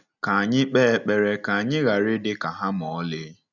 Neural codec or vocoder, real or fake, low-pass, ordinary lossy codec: none; real; 7.2 kHz; none